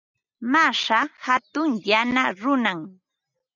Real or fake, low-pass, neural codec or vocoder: real; 7.2 kHz; none